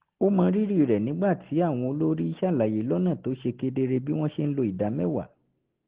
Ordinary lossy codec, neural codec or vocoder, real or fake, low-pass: Opus, 16 kbps; none; real; 3.6 kHz